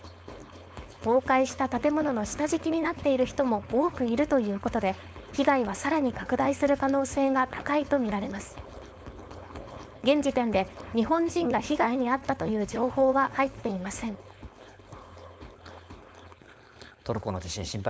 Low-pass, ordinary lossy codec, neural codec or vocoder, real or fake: none; none; codec, 16 kHz, 4.8 kbps, FACodec; fake